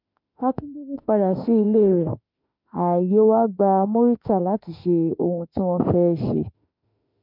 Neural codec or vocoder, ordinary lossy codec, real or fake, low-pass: autoencoder, 48 kHz, 32 numbers a frame, DAC-VAE, trained on Japanese speech; MP3, 48 kbps; fake; 5.4 kHz